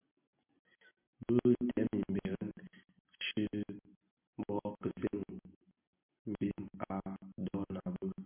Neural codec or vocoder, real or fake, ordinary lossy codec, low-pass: none; real; MP3, 32 kbps; 3.6 kHz